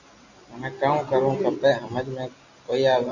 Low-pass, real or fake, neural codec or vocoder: 7.2 kHz; real; none